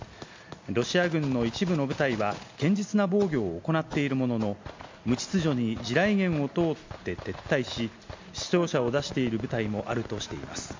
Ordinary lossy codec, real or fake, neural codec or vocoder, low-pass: MP3, 48 kbps; real; none; 7.2 kHz